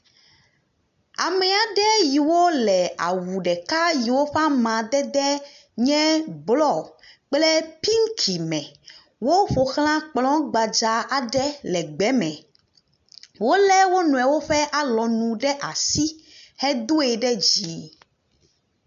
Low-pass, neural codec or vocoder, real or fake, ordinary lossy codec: 7.2 kHz; none; real; AAC, 96 kbps